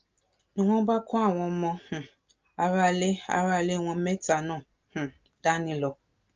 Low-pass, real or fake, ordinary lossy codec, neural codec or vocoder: 7.2 kHz; real; Opus, 24 kbps; none